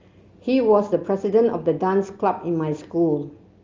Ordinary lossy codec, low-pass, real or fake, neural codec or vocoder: Opus, 32 kbps; 7.2 kHz; real; none